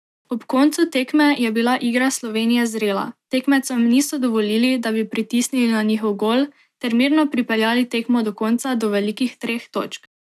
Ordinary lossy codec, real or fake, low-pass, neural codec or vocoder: none; real; 14.4 kHz; none